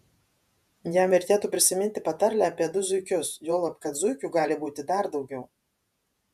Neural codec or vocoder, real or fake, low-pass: vocoder, 48 kHz, 128 mel bands, Vocos; fake; 14.4 kHz